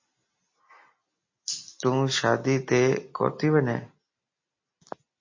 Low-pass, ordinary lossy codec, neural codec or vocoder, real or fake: 7.2 kHz; MP3, 32 kbps; none; real